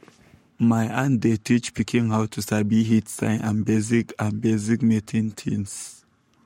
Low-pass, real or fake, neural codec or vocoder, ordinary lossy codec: 19.8 kHz; fake; codec, 44.1 kHz, 7.8 kbps, Pupu-Codec; MP3, 64 kbps